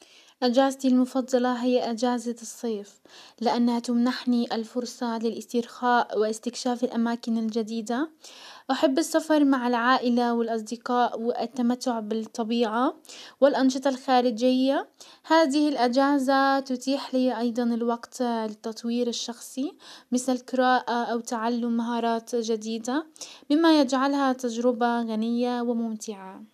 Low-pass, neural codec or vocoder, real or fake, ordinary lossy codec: 14.4 kHz; none; real; none